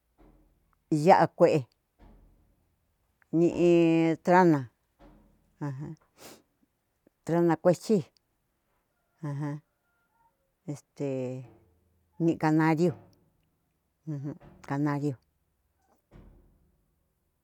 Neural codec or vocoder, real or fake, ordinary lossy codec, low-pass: none; real; none; 19.8 kHz